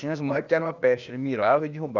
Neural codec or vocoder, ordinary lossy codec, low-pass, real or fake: codec, 16 kHz, 0.8 kbps, ZipCodec; none; 7.2 kHz; fake